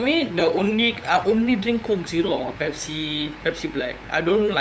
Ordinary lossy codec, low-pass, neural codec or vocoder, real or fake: none; none; codec, 16 kHz, 8 kbps, FunCodec, trained on LibriTTS, 25 frames a second; fake